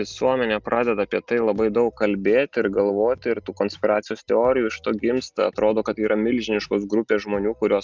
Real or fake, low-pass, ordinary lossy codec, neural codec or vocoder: real; 7.2 kHz; Opus, 32 kbps; none